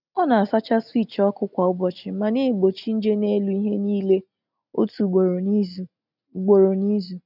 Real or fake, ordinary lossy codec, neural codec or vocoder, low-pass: real; none; none; 5.4 kHz